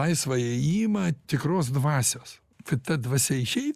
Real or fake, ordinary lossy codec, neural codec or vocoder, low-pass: real; Opus, 64 kbps; none; 14.4 kHz